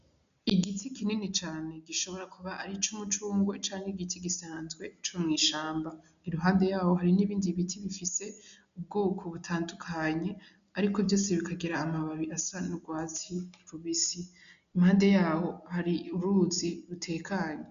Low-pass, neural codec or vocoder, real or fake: 7.2 kHz; none; real